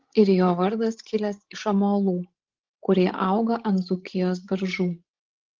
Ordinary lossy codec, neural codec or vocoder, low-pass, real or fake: Opus, 16 kbps; codec, 16 kHz, 16 kbps, FreqCodec, larger model; 7.2 kHz; fake